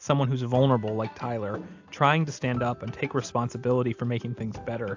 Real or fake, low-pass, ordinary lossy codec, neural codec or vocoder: real; 7.2 kHz; AAC, 48 kbps; none